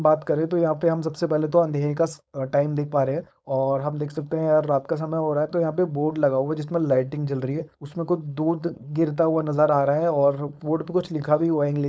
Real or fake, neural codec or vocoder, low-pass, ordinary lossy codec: fake; codec, 16 kHz, 4.8 kbps, FACodec; none; none